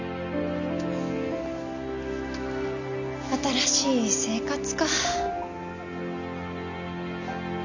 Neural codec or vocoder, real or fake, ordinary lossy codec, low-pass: none; real; none; 7.2 kHz